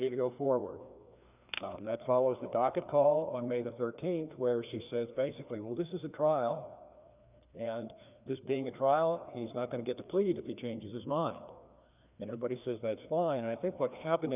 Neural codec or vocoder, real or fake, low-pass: codec, 16 kHz, 2 kbps, FreqCodec, larger model; fake; 3.6 kHz